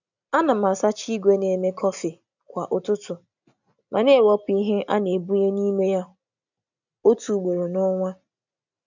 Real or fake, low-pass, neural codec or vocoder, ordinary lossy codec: real; 7.2 kHz; none; none